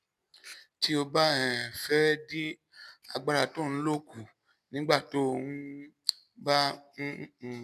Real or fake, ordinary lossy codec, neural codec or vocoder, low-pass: real; none; none; 14.4 kHz